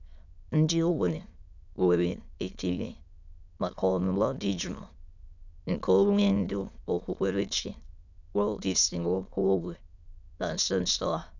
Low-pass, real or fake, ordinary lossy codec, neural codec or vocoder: 7.2 kHz; fake; none; autoencoder, 22.05 kHz, a latent of 192 numbers a frame, VITS, trained on many speakers